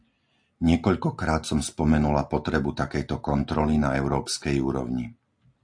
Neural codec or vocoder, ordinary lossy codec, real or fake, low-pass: none; AAC, 64 kbps; real; 9.9 kHz